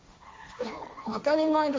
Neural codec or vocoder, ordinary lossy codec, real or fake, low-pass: codec, 16 kHz, 1.1 kbps, Voila-Tokenizer; none; fake; none